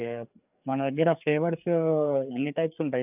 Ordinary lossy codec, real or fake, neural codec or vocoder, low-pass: none; fake; codec, 16 kHz, 4 kbps, FreqCodec, larger model; 3.6 kHz